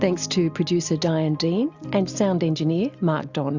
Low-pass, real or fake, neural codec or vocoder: 7.2 kHz; real; none